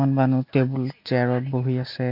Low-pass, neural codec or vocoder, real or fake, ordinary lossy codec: 5.4 kHz; none; real; MP3, 48 kbps